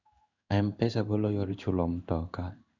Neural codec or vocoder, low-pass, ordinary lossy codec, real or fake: codec, 16 kHz in and 24 kHz out, 1 kbps, XY-Tokenizer; 7.2 kHz; none; fake